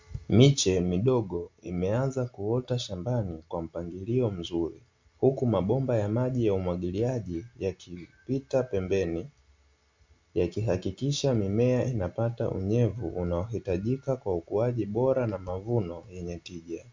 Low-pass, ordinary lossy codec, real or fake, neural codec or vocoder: 7.2 kHz; MP3, 64 kbps; real; none